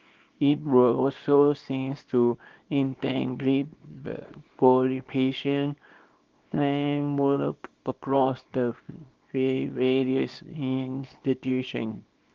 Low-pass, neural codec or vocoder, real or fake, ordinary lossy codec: 7.2 kHz; codec, 24 kHz, 0.9 kbps, WavTokenizer, small release; fake; Opus, 32 kbps